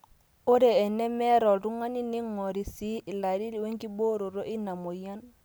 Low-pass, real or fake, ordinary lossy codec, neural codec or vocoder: none; real; none; none